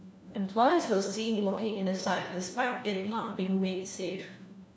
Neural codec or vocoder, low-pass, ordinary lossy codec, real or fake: codec, 16 kHz, 1 kbps, FunCodec, trained on LibriTTS, 50 frames a second; none; none; fake